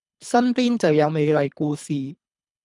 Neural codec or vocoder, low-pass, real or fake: codec, 24 kHz, 3 kbps, HILCodec; 10.8 kHz; fake